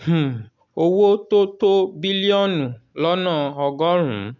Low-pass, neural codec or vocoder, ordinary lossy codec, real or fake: 7.2 kHz; none; none; real